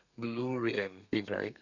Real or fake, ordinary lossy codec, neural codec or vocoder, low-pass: fake; none; codec, 32 kHz, 1.9 kbps, SNAC; 7.2 kHz